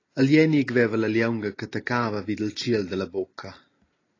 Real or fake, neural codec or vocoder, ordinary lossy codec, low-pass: real; none; AAC, 32 kbps; 7.2 kHz